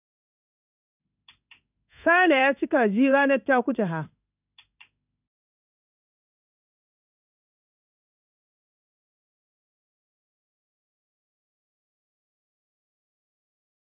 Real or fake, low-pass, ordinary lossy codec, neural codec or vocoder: fake; 3.6 kHz; none; codec, 16 kHz in and 24 kHz out, 1 kbps, XY-Tokenizer